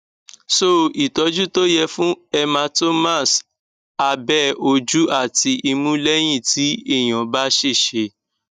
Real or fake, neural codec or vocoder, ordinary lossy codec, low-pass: real; none; none; 14.4 kHz